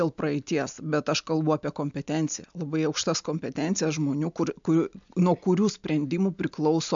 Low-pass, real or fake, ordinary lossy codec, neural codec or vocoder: 7.2 kHz; real; MP3, 64 kbps; none